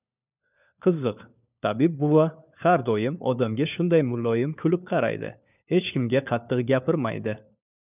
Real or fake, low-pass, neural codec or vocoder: fake; 3.6 kHz; codec, 16 kHz, 4 kbps, FunCodec, trained on LibriTTS, 50 frames a second